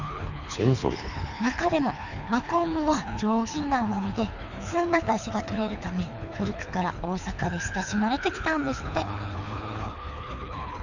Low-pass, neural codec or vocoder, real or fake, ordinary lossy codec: 7.2 kHz; codec, 24 kHz, 3 kbps, HILCodec; fake; none